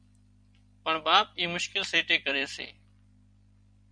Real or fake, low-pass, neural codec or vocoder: fake; 9.9 kHz; vocoder, 44.1 kHz, 128 mel bands every 256 samples, BigVGAN v2